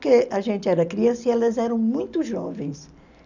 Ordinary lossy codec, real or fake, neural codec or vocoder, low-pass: none; real; none; 7.2 kHz